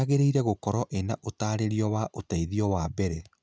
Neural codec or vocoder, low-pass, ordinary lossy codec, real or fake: none; none; none; real